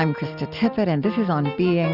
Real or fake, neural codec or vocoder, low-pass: real; none; 5.4 kHz